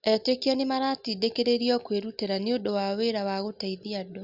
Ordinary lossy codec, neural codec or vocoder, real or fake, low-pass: Opus, 64 kbps; none; real; 5.4 kHz